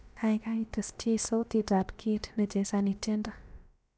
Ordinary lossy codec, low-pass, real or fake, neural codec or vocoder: none; none; fake; codec, 16 kHz, about 1 kbps, DyCAST, with the encoder's durations